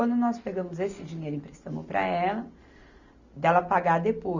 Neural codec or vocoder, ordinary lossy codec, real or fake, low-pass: none; Opus, 64 kbps; real; 7.2 kHz